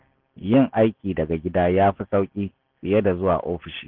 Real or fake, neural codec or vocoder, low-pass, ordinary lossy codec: real; none; 5.4 kHz; Opus, 16 kbps